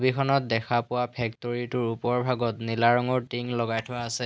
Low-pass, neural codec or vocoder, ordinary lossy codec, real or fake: none; none; none; real